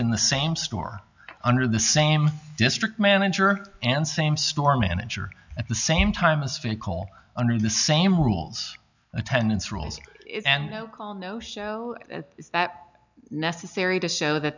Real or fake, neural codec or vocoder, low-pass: real; none; 7.2 kHz